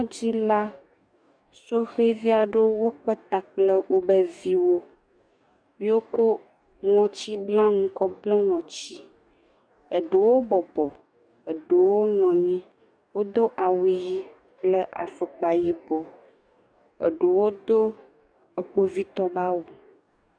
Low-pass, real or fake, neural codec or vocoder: 9.9 kHz; fake; codec, 44.1 kHz, 2.6 kbps, DAC